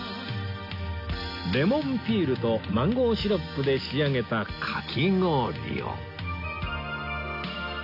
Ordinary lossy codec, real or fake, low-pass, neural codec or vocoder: AAC, 48 kbps; real; 5.4 kHz; none